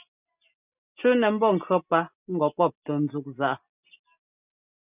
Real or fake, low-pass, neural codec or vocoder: real; 3.6 kHz; none